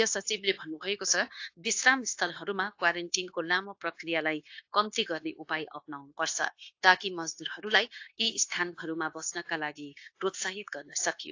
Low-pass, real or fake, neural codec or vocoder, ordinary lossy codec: 7.2 kHz; fake; codec, 16 kHz, 0.9 kbps, LongCat-Audio-Codec; AAC, 48 kbps